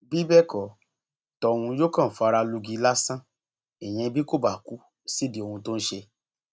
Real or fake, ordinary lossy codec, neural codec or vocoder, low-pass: real; none; none; none